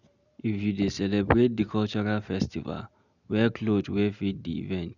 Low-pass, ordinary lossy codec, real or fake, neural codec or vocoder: 7.2 kHz; none; real; none